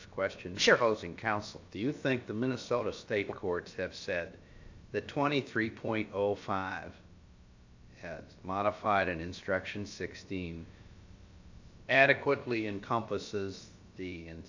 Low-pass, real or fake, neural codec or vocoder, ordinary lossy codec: 7.2 kHz; fake; codec, 16 kHz, about 1 kbps, DyCAST, with the encoder's durations; AAC, 48 kbps